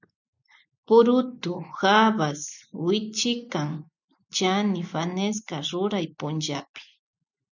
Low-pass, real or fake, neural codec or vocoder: 7.2 kHz; real; none